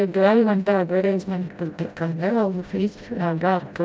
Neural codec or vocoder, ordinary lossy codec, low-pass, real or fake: codec, 16 kHz, 0.5 kbps, FreqCodec, smaller model; none; none; fake